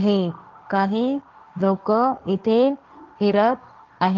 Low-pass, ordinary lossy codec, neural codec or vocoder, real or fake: 7.2 kHz; Opus, 24 kbps; codec, 16 kHz, 1.1 kbps, Voila-Tokenizer; fake